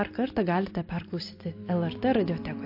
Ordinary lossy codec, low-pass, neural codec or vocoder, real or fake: MP3, 32 kbps; 5.4 kHz; none; real